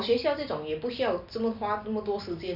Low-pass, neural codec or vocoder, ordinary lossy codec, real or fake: 5.4 kHz; none; none; real